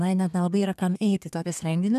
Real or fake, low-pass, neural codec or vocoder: fake; 14.4 kHz; codec, 32 kHz, 1.9 kbps, SNAC